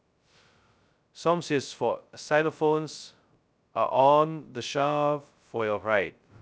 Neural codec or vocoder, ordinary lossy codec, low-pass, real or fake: codec, 16 kHz, 0.2 kbps, FocalCodec; none; none; fake